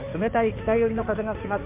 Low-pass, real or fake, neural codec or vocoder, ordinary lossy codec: 3.6 kHz; fake; codec, 16 kHz in and 24 kHz out, 2.2 kbps, FireRedTTS-2 codec; MP3, 24 kbps